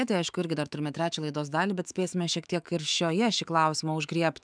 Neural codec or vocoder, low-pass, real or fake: autoencoder, 48 kHz, 128 numbers a frame, DAC-VAE, trained on Japanese speech; 9.9 kHz; fake